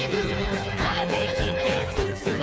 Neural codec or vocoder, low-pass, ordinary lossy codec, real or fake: codec, 16 kHz, 4 kbps, FreqCodec, smaller model; none; none; fake